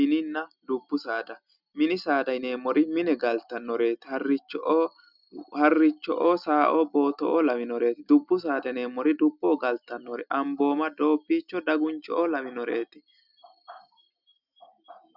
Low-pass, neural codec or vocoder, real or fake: 5.4 kHz; none; real